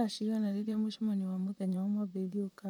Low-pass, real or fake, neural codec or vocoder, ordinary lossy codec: 19.8 kHz; fake; vocoder, 44.1 kHz, 128 mel bands, Pupu-Vocoder; none